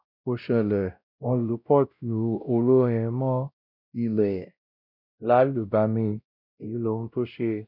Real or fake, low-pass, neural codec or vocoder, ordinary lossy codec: fake; 5.4 kHz; codec, 16 kHz, 0.5 kbps, X-Codec, WavLM features, trained on Multilingual LibriSpeech; none